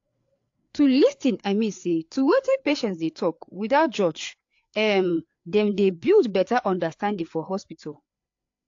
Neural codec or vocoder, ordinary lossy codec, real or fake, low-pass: codec, 16 kHz, 4 kbps, FreqCodec, larger model; AAC, 48 kbps; fake; 7.2 kHz